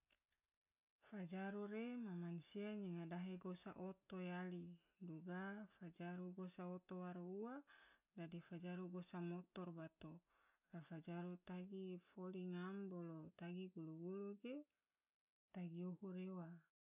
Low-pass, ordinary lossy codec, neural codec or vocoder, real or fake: 3.6 kHz; none; none; real